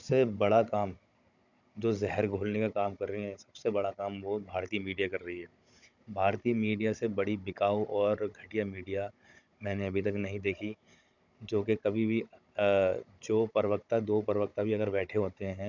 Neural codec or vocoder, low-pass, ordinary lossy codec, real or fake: codec, 16 kHz, 16 kbps, FunCodec, trained on Chinese and English, 50 frames a second; 7.2 kHz; none; fake